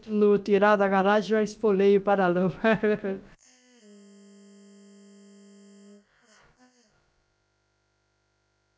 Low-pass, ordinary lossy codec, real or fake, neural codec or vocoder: none; none; fake; codec, 16 kHz, about 1 kbps, DyCAST, with the encoder's durations